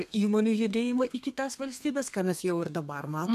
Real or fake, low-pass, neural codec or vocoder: fake; 14.4 kHz; codec, 32 kHz, 1.9 kbps, SNAC